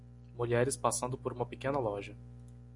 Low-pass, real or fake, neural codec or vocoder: 10.8 kHz; real; none